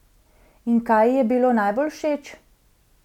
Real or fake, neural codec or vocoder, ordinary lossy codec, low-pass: real; none; none; 19.8 kHz